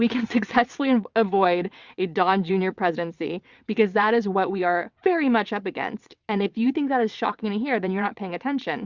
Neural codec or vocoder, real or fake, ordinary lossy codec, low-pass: vocoder, 22.05 kHz, 80 mel bands, WaveNeXt; fake; Opus, 64 kbps; 7.2 kHz